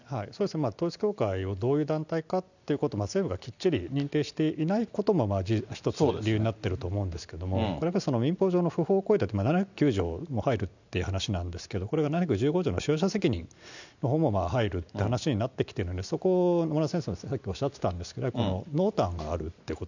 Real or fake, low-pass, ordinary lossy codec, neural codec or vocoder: real; 7.2 kHz; none; none